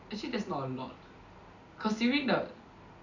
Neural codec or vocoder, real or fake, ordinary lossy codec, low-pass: none; real; none; 7.2 kHz